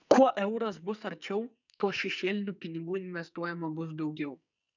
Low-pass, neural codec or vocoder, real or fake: 7.2 kHz; codec, 44.1 kHz, 2.6 kbps, SNAC; fake